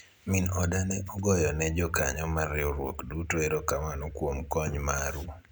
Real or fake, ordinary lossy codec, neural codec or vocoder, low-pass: real; none; none; none